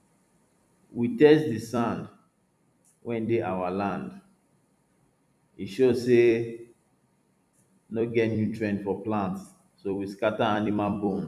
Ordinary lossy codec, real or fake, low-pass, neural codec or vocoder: none; fake; 14.4 kHz; vocoder, 44.1 kHz, 128 mel bands every 256 samples, BigVGAN v2